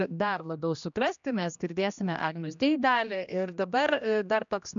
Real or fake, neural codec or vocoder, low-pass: fake; codec, 16 kHz, 1 kbps, X-Codec, HuBERT features, trained on general audio; 7.2 kHz